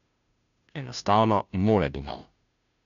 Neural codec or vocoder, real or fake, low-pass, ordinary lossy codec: codec, 16 kHz, 0.5 kbps, FunCodec, trained on Chinese and English, 25 frames a second; fake; 7.2 kHz; none